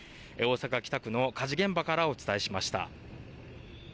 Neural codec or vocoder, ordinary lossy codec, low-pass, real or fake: none; none; none; real